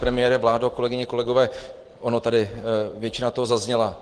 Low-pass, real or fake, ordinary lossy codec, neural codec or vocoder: 9.9 kHz; real; Opus, 16 kbps; none